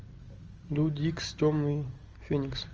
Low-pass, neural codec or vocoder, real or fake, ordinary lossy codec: 7.2 kHz; none; real; Opus, 24 kbps